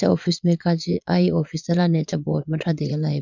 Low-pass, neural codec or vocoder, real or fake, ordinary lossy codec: 7.2 kHz; vocoder, 22.05 kHz, 80 mel bands, Vocos; fake; none